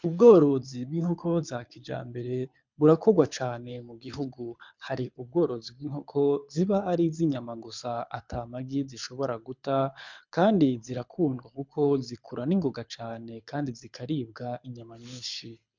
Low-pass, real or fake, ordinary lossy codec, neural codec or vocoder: 7.2 kHz; fake; MP3, 64 kbps; codec, 24 kHz, 6 kbps, HILCodec